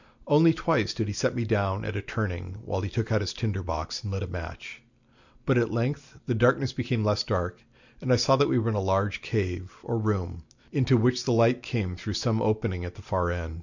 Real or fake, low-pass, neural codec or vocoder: real; 7.2 kHz; none